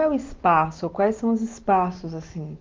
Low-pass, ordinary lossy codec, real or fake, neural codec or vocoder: 7.2 kHz; Opus, 24 kbps; real; none